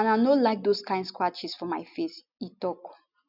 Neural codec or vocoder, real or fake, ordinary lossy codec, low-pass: none; real; none; 5.4 kHz